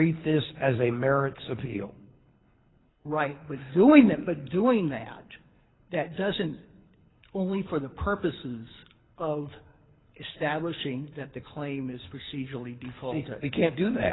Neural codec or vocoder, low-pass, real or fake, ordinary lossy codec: codec, 24 kHz, 6 kbps, HILCodec; 7.2 kHz; fake; AAC, 16 kbps